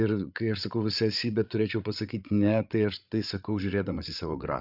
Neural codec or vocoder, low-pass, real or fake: codec, 16 kHz, 8 kbps, FreqCodec, larger model; 5.4 kHz; fake